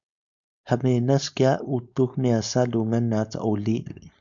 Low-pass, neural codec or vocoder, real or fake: 7.2 kHz; codec, 16 kHz, 4.8 kbps, FACodec; fake